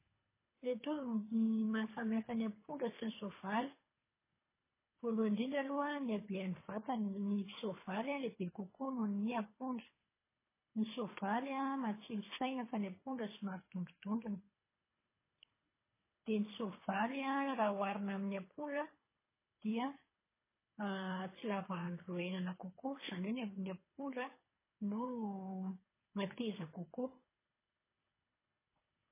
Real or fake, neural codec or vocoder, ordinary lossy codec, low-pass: fake; codec, 24 kHz, 3 kbps, HILCodec; MP3, 16 kbps; 3.6 kHz